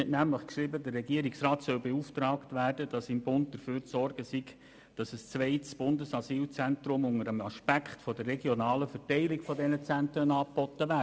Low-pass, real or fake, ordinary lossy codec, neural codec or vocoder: none; real; none; none